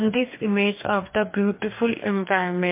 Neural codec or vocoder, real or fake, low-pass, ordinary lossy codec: codec, 44.1 kHz, 2.6 kbps, DAC; fake; 3.6 kHz; MP3, 24 kbps